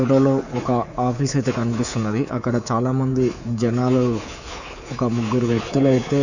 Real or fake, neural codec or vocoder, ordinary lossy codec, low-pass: fake; codec, 24 kHz, 3.1 kbps, DualCodec; none; 7.2 kHz